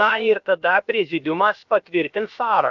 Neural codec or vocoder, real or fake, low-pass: codec, 16 kHz, about 1 kbps, DyCAST, with the encoder's durations; fake; 7.2 kHz